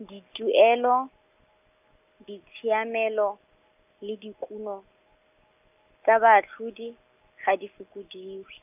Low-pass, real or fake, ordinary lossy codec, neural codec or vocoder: 3.6 kHz; real; none; none